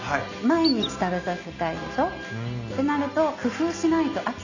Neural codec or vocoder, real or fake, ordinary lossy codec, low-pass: none; real; none; 7.2 kHz